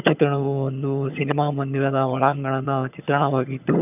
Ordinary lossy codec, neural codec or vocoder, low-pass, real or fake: none; vocoder, 22.05 kHz, 80 mel bands, HiFi-GAN; 3.6 kHz; fake